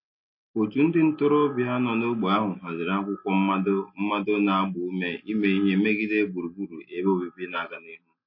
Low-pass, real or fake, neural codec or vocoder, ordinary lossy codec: 5.4 kHz; real; none; MP3, 32 kbps